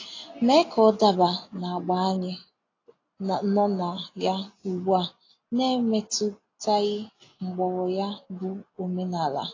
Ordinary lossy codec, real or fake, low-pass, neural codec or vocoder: AAC, 32 kbps; real; 7.2 kHz; none